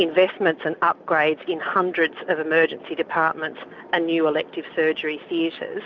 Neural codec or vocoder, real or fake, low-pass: none; real; 7.2 kHz